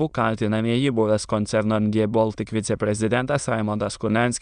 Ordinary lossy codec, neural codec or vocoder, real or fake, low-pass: Opus, 64 kbps; autoencoder, 22.05 kHz, a latent of 192 numbers a frame, VITS, trained on many speakers; fake; 9.9 kHz